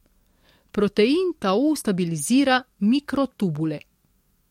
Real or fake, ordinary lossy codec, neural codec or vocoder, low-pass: fake; MP3, 64 kbps; codec, 44.1 kHz, 7.8 kbps, DAC; 19.8 kHz